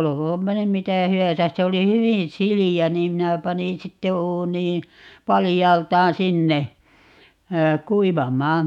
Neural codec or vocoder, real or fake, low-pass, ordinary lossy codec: autoencoder, 48 kHz, 128 numbers a frame, DAC-VAE, trained on Japanese speech; fake; 19.8 kHz; none